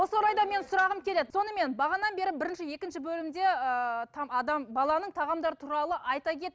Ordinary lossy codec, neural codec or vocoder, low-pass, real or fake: none; none; none; real